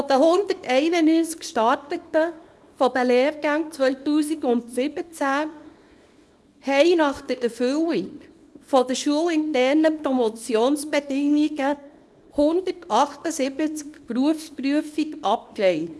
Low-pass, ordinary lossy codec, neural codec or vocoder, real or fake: none; none; codec, 24 kHz, 0.9 kbps, WavTokenizer, small release; fake